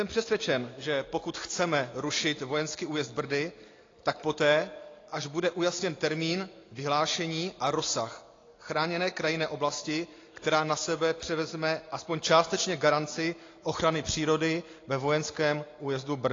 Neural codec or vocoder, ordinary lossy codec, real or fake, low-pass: none; AAC, 32 kbps; real; 7.2 kHz